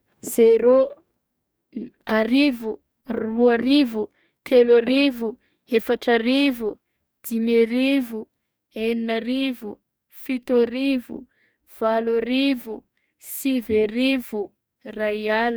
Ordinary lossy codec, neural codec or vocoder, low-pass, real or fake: none; codec, 44.1 kHz, 2.6 kbps, DAC; none; fake